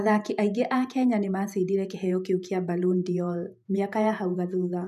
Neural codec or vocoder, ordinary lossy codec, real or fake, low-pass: vocoder, 48 kHz, 128 mel bands, Vocos; none; fake; 14.4 kHz